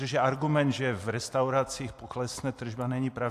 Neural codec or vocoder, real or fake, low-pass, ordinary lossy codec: none; real; 14.4 kHz; AAC, 64 kbps